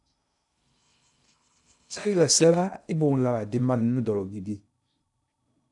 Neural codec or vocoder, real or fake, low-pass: codec, 16 kHz in and 24 kHz out, 0.6 kbps, FocalCodec, streaming, 2048 codes; fake; 10.8 kHz